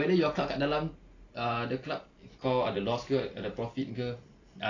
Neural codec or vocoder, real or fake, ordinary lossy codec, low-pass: none; real; AAC, 32 kbps; 7.2 kHz